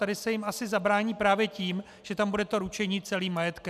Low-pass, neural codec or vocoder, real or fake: 14.4 kHz; none; real